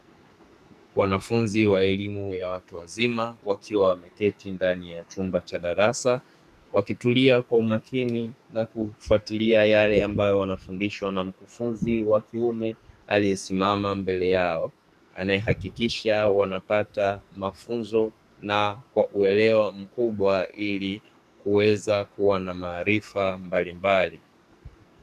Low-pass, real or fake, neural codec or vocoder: 14.4 kHz; fake; codec, 44.1 kHz, 2.6 kbps, SNAC